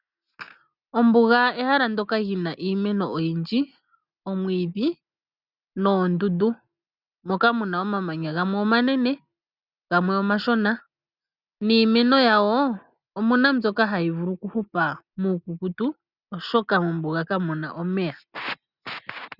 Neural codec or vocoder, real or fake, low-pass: none; real; 5.4 kHz